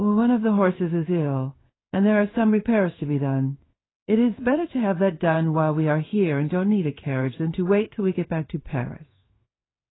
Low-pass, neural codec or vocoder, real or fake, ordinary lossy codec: 7.2 kHz; codec, 16 kHz in and 24 kHz out, 1 kbps, XY-Tokenizer; fake; AAC, 16 kbps